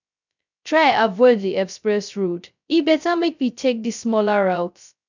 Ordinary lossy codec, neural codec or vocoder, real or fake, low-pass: none; codec, 16 kHz, 0.2 kbps, FocalCodec; fake; 7.2 kHz